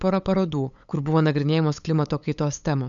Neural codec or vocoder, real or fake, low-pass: codec, 16 kHz, 8 kbps, FunCodec, trained on LibriTTS, 25 frames a second; fake; 7.2 kHz